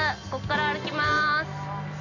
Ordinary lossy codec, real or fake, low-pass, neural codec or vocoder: none; real; 7.2 kHz; none